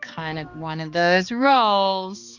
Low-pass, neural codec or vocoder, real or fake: 7.2 kHz; codec, 16 kHz, 2 kbps, X-Codec, HuBERT features, trained on balanced general audio; fake